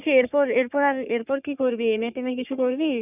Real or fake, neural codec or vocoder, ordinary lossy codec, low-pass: fake; codec, 44.1 kHz, 3.4 kbps, Pupu-Codec; none; 3.6 kHz